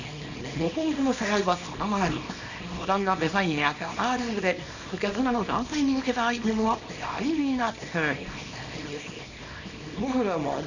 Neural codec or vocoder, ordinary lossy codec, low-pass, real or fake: codec, 24 kHz, 0.9 kbps, WavTokenizer, small release; none; 7.2 kHz; fake